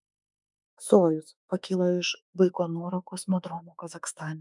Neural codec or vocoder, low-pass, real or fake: autoencoder, 48 kHz, 32 numbers a frame, DAC-VAE, trained on Japanese speech; 10.8 kHz; fake